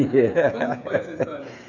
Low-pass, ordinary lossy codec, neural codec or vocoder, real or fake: 7.2 kHz; none; codec, 16 kHz, 8 kbps, FreqCodec, smaller model; fake